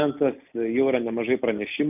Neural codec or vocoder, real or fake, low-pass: none; real; 3.6 kHz